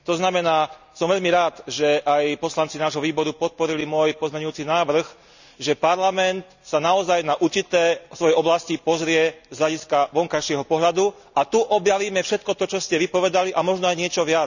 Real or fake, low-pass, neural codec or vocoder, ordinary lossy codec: real; 7.2 kHz; none; none